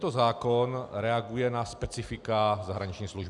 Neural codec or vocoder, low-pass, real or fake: none; 10.8 kHz; real